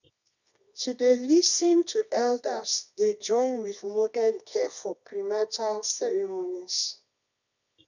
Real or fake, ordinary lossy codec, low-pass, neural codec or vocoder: fake; none; 7.2 kHz; codec, 24 kHz, 0.9 kbps, WavTokenizer, medium music audio release